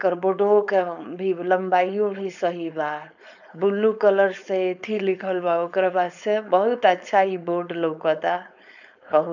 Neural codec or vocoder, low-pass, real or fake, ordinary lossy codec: codec, 16 kHz, 4.8 kbps, FACodec; 7.2 kHz; fake; none